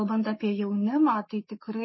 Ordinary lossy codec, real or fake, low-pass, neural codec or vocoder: MP3, 24 kbps; fake; 7.2 kHz; codec, 44.1 kHz, 7.8 kbps, Pupu-Codec